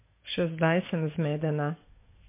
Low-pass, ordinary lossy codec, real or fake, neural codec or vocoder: 3.6 kHz; MP3, 24 kbps; fake; codec, 44.1 kHz, 7.8 kbps, DAC